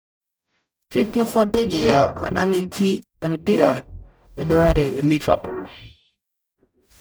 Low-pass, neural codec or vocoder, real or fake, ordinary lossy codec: none; codec, 44.1 kHz, 0.9 kbps, DAC; fake; none